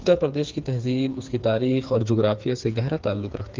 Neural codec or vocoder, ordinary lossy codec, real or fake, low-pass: codec, 16 kHz, 4 kbps, FreqCodec, smaller model; Opus, 32 kbps; fake; 7.2 kHz